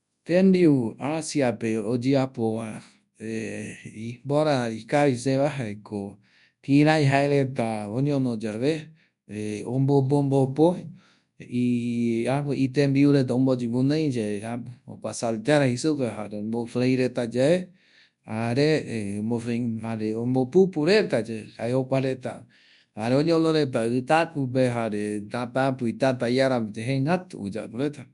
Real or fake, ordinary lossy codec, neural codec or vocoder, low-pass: fake; none; codec, 24 kHz, 0.9 kbps, WavTokenizer, large speech release; 10.8 kHz